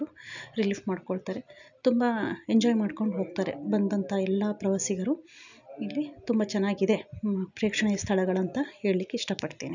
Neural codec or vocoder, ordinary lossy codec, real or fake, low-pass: none; none; real; 7.2 kHz